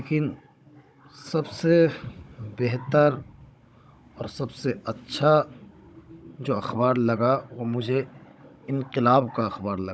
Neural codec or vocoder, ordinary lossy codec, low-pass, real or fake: codec, 16 kHz, 16 kbps, FunCodec, trained on Chinese and English, 50 frames a second; none; none; fake